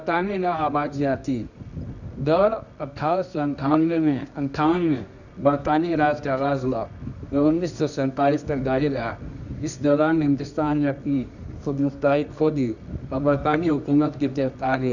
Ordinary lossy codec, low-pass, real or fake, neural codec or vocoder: none; 7.2 kHz; fake; codec, 24 kHz, 0.9 kbps, WavTokenizer, medium music audio release